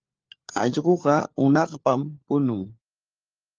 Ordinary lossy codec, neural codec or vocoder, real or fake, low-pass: Opus, 24 kbps; codec, 16 kHz, 4 kbps, FunCodec, trained on LibriTTS, 50 frames a second; fake; 7.2 kHz